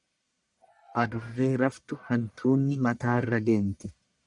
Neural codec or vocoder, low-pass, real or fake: codec, 44.1 kHz, 1.7 kbps, Pupu-Codec; 10.8 kHz; fake